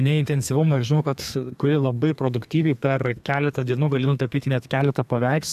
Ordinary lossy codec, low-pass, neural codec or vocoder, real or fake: AAC, 96 kbps; 14.4 kHz; codec, 32 kHz, 1.9 kbps, SNAC; fake